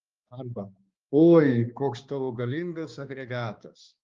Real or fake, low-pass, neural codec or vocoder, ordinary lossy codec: fake; 7.2 kHz; codec, 16 kHz, 2 kbps, X-Codec, HuBERT features, trained on balanced general audio; Opus, 32 kbps